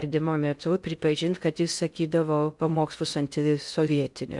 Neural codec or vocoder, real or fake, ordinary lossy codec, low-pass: codec, 16 kHz in and 24 kHz out, 0.6 kbps, FocalCodec, streaming, 2048 codes; fake; MP3, 96 kbps; 10.8 kHz